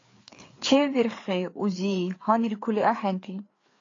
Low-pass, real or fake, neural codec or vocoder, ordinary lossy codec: 7.2 kHz; fake; codec, 16 kHz, 4 kbps, FreqCodec, larger model; AAC, 32 kbps